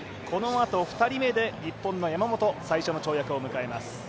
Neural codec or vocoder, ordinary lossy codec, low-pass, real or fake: none; none; none; real